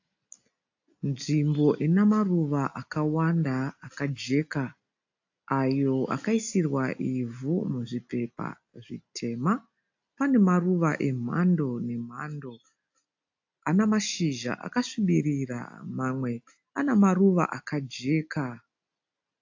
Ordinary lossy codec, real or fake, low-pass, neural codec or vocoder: AAC, 48 kbps; real; 7.2 kHz; none